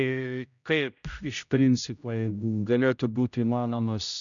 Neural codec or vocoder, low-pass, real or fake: codec, 16 kHz, 0.5 kbps, X-Codec, HuBERT features, trained on general audio; 7.2 kHz; fake